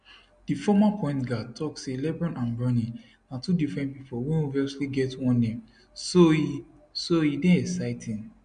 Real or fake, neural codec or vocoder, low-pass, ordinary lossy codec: real; none; 9.9 kHz; MP3, 64 kbps